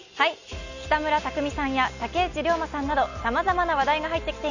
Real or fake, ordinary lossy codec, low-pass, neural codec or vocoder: real; none; 7.2 kHz; none